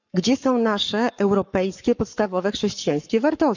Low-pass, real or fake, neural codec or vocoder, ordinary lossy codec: 7.2 kHz; fake; codec, 44.1 kHz, 7.8 kbps, Pupu-Codec; none